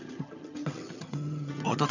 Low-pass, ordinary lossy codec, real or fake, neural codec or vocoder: 7.2 kHz; none; fake; vocoder, 22.05 kHz, 80 mel bands, HiFi-GAN